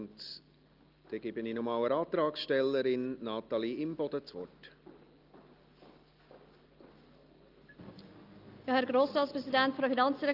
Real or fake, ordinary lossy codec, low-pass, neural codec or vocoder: real; Opus, 32 kbps; 5.4 kHz; none